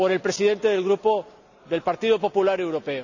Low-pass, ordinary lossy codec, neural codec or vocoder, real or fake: 7.2 kHz; none; none; real